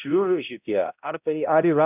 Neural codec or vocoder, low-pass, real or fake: codec, 16 kHz, 0.5 kbps, X-Codec, HuBERT features, trained on balanced general audio; 3.6 kHz; fake